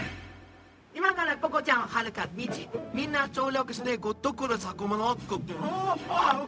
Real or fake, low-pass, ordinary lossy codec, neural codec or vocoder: fake; none; none; codec, 16 kHz, 0.4 kbps, LongCat-Audio-Codec